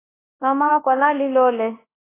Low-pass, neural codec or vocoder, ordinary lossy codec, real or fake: 3.6 kHz; codec, 24 kHz, 0.9 kbps, WavTokenizer, large speech release; AAC, 16 kbps; fake